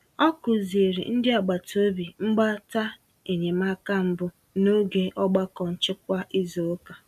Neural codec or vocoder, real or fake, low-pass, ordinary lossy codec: none; real; 14.4 kHz; none